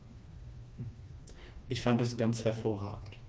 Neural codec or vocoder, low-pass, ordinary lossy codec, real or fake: codec, 16 kHz, 2 kbps, FreqCodec, smaller model; none; none; fake